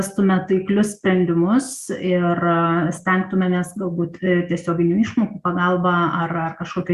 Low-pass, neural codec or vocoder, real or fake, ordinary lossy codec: 14.4 kHz; none; real; Opus, 64 kbps